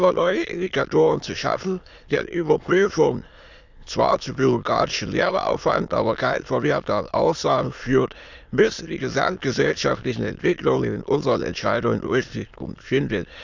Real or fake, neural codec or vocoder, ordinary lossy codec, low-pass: fake; autoencoder, 22.05 kHz, a latent of 192 numbers a frame, VITS, trained on many speakers; none; 7.2 kHz